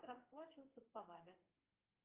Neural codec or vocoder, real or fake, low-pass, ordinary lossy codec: codec, 32 kHz, 1.9 kbps, SNAC; fake; 3.6 kHz; Opus, 24 kbps